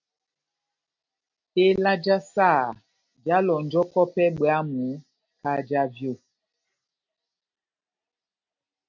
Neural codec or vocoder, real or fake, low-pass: none; real; 7.2 kHz